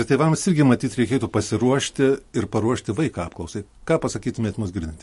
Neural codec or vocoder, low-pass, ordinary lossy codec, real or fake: none; 14.4 kHz; MP3, 48 kbps; real